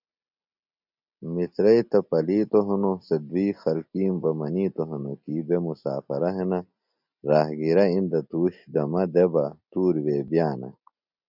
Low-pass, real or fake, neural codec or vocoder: 5.4 kHz; real; none